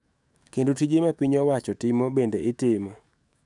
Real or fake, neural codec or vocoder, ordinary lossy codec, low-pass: fake; autoencoder, 48 kHz, 128 numbers a frame, DAC-VAE, trained on Japanese speech; none; 10.8 kHz